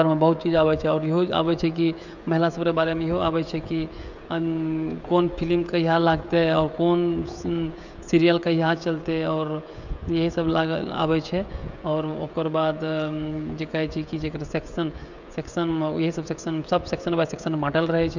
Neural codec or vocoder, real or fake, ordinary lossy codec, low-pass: codec, 16 kHz, 16 kbps, FreqCodec, smaller model; fake; none; 7.2 kHz